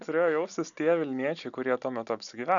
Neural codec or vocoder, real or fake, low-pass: none; real; 7.2 kHz